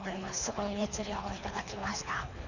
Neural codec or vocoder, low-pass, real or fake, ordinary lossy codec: codec, 24 kHz, 3 kbps, HILCodec; 7.2 kHz; fake; none